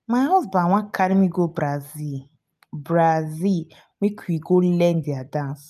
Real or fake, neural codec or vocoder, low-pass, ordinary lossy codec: real; none; 14.4 kHz; none